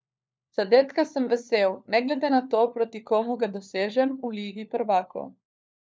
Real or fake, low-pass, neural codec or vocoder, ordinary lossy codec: fake; none; codec, 16 kHz, 4 kbps, FunCodec, trained on LibriTTS, 50 frames a second; none